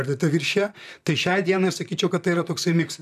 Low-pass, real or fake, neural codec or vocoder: 14.4 kHz; fake; vocoder, 44.1 kHz, 128 mel bands, Pupu-Vocoder